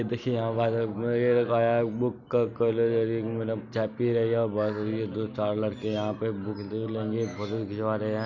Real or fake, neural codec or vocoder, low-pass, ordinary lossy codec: real; none; 7.2 kHz; none